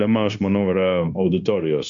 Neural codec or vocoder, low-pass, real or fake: codec, 16 kHz, 0.9 kbps, LongCat-Audio-Codec; 7.2 kHz; fake